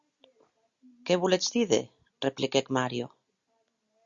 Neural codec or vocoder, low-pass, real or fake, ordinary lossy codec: none; 7.2 kHz; real; Opus, 64 kbps